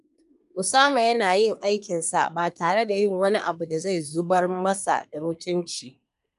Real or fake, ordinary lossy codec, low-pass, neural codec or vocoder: fake; none; 10.8 kHz; codec, 24 kHz, 1 kbps, SNAC